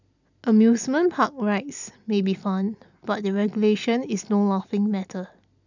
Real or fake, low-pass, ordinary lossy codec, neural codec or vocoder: real; 7.2 kHz; none; none